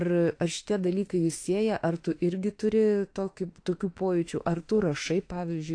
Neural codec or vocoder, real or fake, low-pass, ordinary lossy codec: autoencoder, 48 kHz, 32 numbers a frame, DAC-VAE, trained on Japanese speech; fake; 9.9 kHz; AAC, 48 kbps